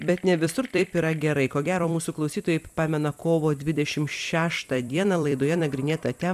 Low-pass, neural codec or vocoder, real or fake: 14.4 kHz; vocoder, 44.1 kHz, 128 mel bands every 256 samples, BigVGAN v2; fake